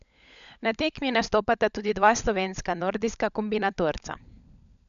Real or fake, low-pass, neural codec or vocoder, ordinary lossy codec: fake; 7.2 kHz; codec, 16 kHz, 16 kbps, FunCodec, trained on LibriTTS, 50 frames a second; MP3, 96 kbps